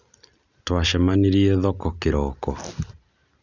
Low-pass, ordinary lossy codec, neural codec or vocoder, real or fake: 7.2 kHz; none; none; real